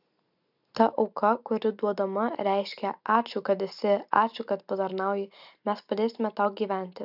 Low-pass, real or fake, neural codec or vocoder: 5.4 kHz; real; none